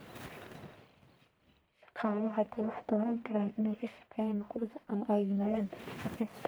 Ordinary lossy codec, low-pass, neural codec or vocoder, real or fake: none; none; codec, 44.1 kHz, 1.7 kbps, Pupu-Codec; fake